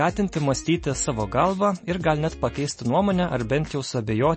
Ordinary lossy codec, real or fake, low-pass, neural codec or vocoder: MP3, 32 kbps; real; 10.8 kHz; none